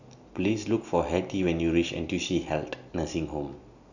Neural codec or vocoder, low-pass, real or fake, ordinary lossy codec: none; 7.2 kHz; real; none